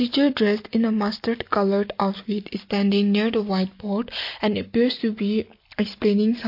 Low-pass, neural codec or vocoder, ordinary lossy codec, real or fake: 5.4 kHz; none; MP3, 32 kbps; real